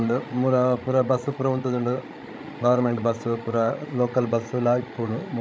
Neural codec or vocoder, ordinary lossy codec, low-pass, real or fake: codec, 16 kHz, 16 kbps, FreqCodec, larger model; none; none; fake